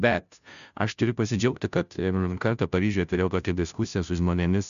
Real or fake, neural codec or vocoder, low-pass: fake; codec, 16 kHz, 0.5 kbps, FunCodec, trained on Chinese and English, 25 frames a second; 7.2 kHz